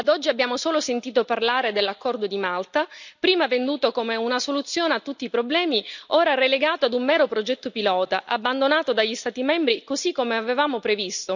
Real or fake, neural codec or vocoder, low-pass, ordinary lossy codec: real; none; 7.2 kHz; none